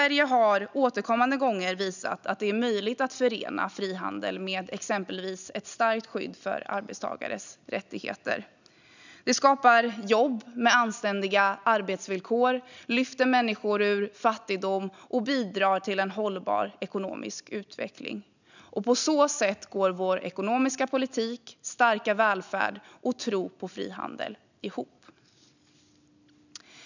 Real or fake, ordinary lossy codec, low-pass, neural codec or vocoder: real; none; 7.2 kHz; none